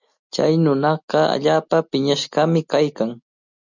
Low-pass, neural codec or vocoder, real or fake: 7.2 kHz; none; real